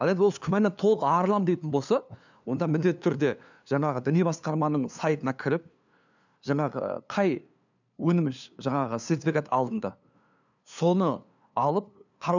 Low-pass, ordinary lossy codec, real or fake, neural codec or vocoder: 7.2 kHz; none; fake; codec, 16 kHz, 2 kbps, FunCodec, trained on LibriTTS, 25 frames a second